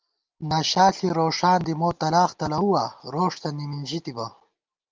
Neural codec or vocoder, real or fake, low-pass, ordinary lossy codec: none; real; 7.2 kHz; Opus, 24 kbps